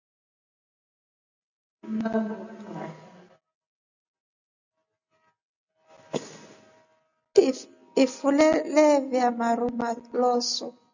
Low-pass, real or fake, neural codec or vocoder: 7.2 kHz; real; none